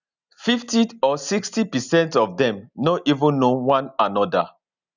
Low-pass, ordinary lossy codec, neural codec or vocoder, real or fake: 7.2 kHz; none; none; real